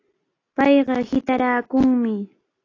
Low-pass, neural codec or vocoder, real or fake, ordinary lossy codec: 7.2 kHz; none; real; AAC, 32 kbps